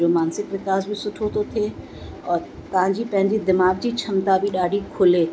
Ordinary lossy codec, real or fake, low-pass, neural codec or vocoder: none; real; none; none